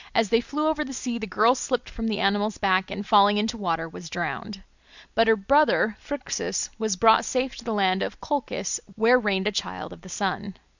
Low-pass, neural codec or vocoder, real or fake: 7.2 kHz; none; real